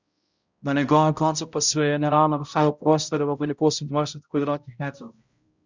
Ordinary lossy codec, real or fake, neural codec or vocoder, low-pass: Opus, 64 kbps; fake; codec, 16 kHz, 0.5 kbps, X-Codec, HuBERT features, trained on balanced general audio; 7.2 kHz